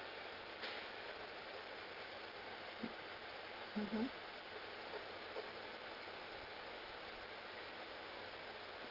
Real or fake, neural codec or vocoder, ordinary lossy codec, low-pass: real; none; Opus, 16 kbps; 5.4 kHz